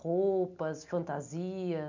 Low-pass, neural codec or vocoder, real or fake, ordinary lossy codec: 7.2 kHz; none; real; none